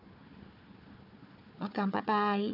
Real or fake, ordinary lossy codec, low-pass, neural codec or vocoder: fake; none; 5.4 kHz; codec, 16 kHz, 4 kbps, FunCodec, trained on Chinese and English, 50 frames a second